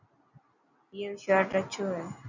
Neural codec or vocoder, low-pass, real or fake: none; 7.2 kHz; real